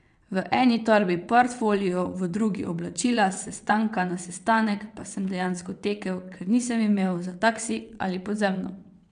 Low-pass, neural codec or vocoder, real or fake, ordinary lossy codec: 9.9 kHz; vocoder, 22.05 kHz, 80 mel bands, WaveNeXt; fake; none